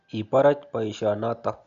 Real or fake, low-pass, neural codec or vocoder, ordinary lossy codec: real; 7.2 kHz; none; none